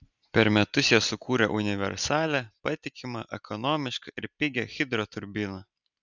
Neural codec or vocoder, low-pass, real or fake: none; 7.2 kHz; real